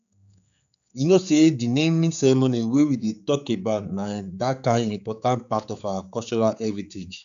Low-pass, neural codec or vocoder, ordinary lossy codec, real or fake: 7.2 kHz; codec, 16 kHz, 4 kbps, X-Codec, HuBERT features, trained on general audio; none; fake